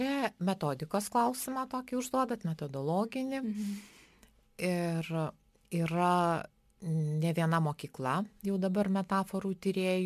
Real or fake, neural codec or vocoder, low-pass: real; none; 14.4 kHz